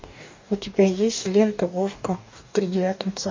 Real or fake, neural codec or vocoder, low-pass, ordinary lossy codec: fake; codec, 44.1 kHz, 2.6 kbps, DAC; 7.2 kHz; MP3, 64 kbps